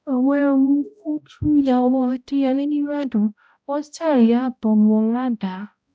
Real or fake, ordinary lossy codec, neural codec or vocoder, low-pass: fake; none; codec, 16 kHz, 0.5 kbps, X-Codec, HuBERT features, trained on balanced general audio; none